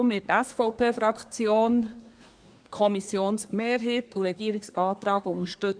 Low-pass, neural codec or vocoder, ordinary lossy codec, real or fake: 9.9 kHz; codec, 24 kHz, 1 kbps, SNAC; none; fake